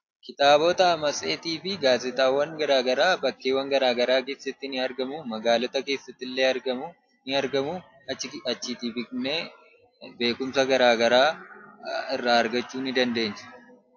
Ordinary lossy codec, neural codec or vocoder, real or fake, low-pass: AAC, 48 kbps; none; real; 7.2 kHz